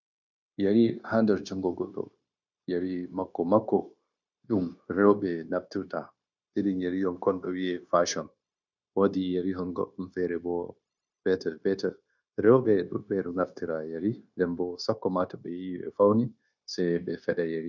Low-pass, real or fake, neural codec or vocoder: 7.2 kHz; fake; codec, 16 kHz, 0.9 kbps, LongCat-Audio-Codec